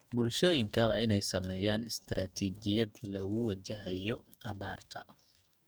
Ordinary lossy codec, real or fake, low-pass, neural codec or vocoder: none; fake; none; codec, 44.1 kHz, 2.6 kbps, DAC